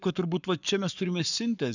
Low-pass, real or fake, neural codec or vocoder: 7.2 kHz; fake; codec, 44.1 kHz, 7.8 kbps, Pupu-Codec